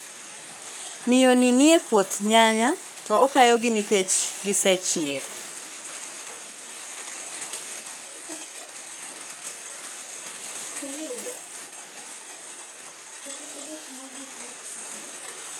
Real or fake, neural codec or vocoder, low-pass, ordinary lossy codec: fake; codec, 44.1 kHz, 3.4 kbps, Pupu-Codec; none; none